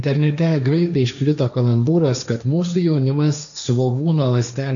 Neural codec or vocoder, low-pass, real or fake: codec, 16 kHz, 1.1 kbps, Voila-Tokenizer; 7.2 kHz; fake